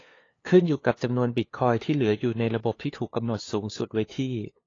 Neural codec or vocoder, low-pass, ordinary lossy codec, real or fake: codec, 16 kHz, 2 kbps, FunCodec, trained on LibriTTS, 25 frames a second; 7.2 kHz; AAC, 32 kbps; fake